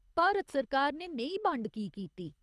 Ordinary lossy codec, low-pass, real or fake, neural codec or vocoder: none; none; fake; codec, 24 kHz, 6 kbps, HILCodec